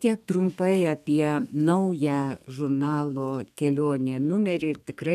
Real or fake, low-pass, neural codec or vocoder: fake; 14.4 kHz; codec, 32 kHz, 1.9 kbps, SNAC